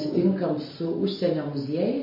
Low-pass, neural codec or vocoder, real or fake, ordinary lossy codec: 5.4 kHz; none; real; MP3, 24 kbps